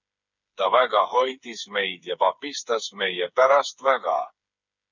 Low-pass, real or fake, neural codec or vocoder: 7.2 kHz; fake; codec, 16 kHz, 8 kbps, FreqCodec, smaller model